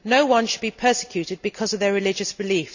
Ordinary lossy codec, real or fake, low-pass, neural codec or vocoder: none; real; 7.2 kHz; none